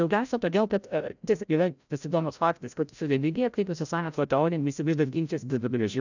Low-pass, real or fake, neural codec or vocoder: 7.2 kHz; fake; codec, 16 kHz, 0.5 kbps, FreqCodec, larger model